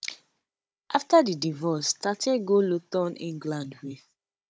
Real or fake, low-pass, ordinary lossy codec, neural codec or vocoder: fake; none; none; codec, 16 kHz, 16 kbps, FunCodec, trained on Chinese and English, 50 frames a second